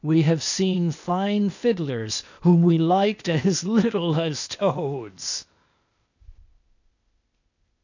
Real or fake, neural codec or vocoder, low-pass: fake; codec, 16 kHz, 0.8 kbps, ZipCodec; 7.2 kHz